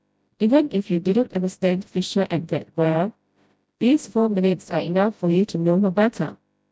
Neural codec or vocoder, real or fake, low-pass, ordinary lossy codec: codec, 16 kHz, 0.5 kbps, FreqCodec, smaller model; fake; none; none